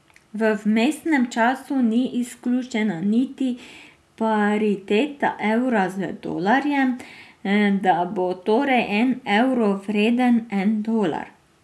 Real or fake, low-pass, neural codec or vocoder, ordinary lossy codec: real; none; none; none